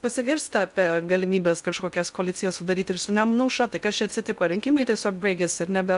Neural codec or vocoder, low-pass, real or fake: codec, 16 kHz in and 24 kHz out, 0.6 kbps, FocalCodec, streaming, 2048 codes; 10.8 kHz; fake